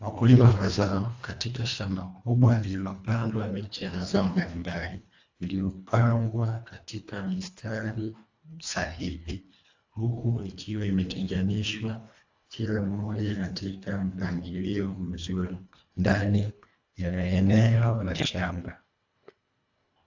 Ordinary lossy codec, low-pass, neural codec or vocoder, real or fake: AAC, 48 kbps; 7.2 kHz; codec, 24 kHz, 1.5 kbps, HILCodec; fake